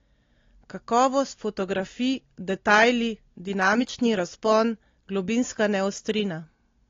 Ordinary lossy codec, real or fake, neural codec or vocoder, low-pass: AAC, 32 kbps; real; none; 7.2 kHz